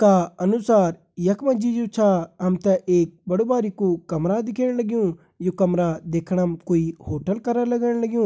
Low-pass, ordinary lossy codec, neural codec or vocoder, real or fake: none; none; none; real